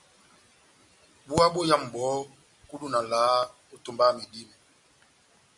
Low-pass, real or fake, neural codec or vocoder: 10.8 kHz; real; none